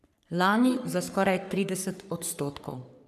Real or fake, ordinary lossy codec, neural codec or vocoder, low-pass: fake; none; codec, 44.1 kHz, 3.4 kbps, Pupu-Codec; 14.4 kHz